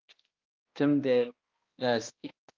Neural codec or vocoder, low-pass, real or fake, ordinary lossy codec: codec, 16 kHz, 1 kbps, X-Codec, HuBERT features, trained on balanced general audio; 7.2 kHz; fake; Opus, 24 kbps